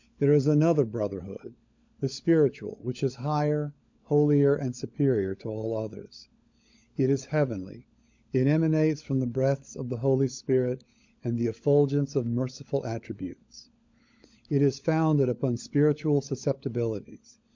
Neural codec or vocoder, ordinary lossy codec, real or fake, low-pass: codec, 16 kHz, 16 kbps, FunCodec, trained on LibriTTS, 50 frames a second; MP3, 64 kbps; fake; 7.2 kHz